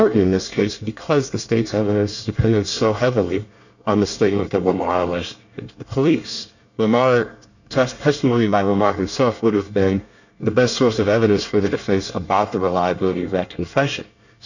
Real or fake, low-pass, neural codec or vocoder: fake; 7.2 kHz; codec, 24 kHz, 1 kbps, SNAC